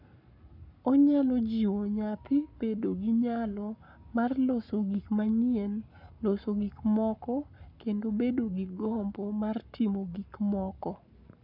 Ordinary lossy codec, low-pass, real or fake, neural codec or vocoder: none; 5.4 kHz; fake; codec, 44.1 kHz, 7.8 kbps, Pupu-Codec